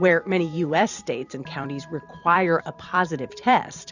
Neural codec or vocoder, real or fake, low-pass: vocoder, 44.1 kHz, 128 mel bands every 256 samples, BigVGAN v2; fake; 7.2 kHz